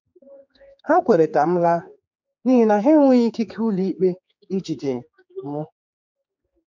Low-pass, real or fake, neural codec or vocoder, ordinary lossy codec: 7.2 kHz; fake; codec, 16 kHz, 4 kbps, X-Codec, HuBERT features, trained on general audio; MP3, 64 kbps